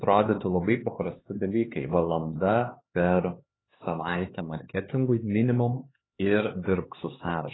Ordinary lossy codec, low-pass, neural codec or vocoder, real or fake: AAC, 16 kbps; 7.2 kHz; codec, 16 kHz, 4 kbps, X-Codec, HuBERT features, trained on LibriSpeech; fake